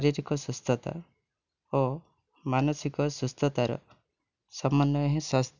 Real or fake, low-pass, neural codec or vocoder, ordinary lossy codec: real; 7.2 kHz; none; none